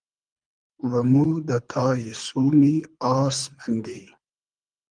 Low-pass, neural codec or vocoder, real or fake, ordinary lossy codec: 9.9 kHz; codec, 24 kHz, 3 kbps, HILCodec; fake; Opus, 32 kbps